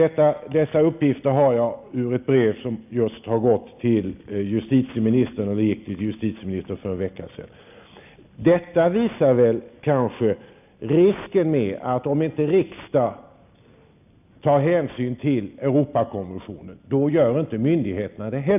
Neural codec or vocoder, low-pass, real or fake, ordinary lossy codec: none; 3.6 kHz; real; none